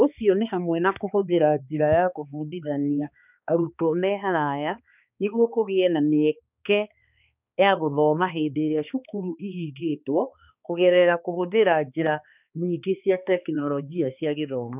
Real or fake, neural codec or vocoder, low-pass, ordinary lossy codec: fake; codec, 16 kHz, 2 kbps, X-Codec, HuBERT features, trained on balanced general audio; 3.6 kHz; none